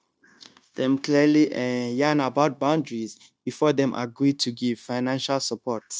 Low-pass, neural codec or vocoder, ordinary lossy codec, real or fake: none; codec, 16 kHz, 0.9 kbps, LongCat-Audio-Codec; none; fake